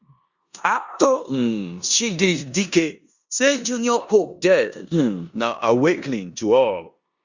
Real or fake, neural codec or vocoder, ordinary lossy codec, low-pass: fake; codec, 16 kHz in and 24 kHz out, 0.9 kbps, LongCat-Audio-Codec, four codebook decoder; Opus, 64 kbps; 7.2 kHz